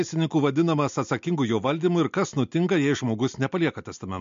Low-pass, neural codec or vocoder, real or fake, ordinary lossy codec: 7.2 kHz; none; real; MP3, 48 kbps